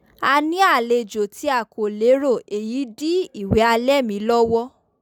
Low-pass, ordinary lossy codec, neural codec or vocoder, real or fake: 19.8 kHz; none; none; real